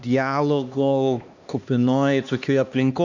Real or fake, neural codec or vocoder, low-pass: fake; codec, 16 kHz, 2 kbps, X-Codec, HuBERT features, trained on LibriSpeech; 7.2 kHz